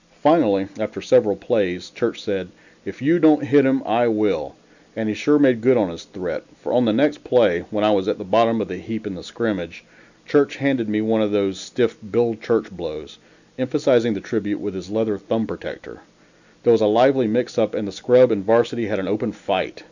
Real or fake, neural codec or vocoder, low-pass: real; none; 7.2 kHz